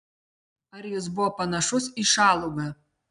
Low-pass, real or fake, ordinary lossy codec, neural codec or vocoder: 9.9 kHz; real; MP3, 96 kbps; none